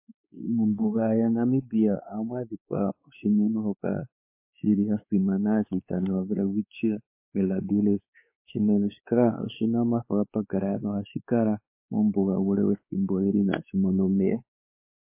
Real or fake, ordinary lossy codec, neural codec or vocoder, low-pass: fake; MP3, 24 kbps; codec, 16 kHz, 4 kbps, X-Codec, WavLM features, trained on Multilingual LibriSpeech; 3.6 kHz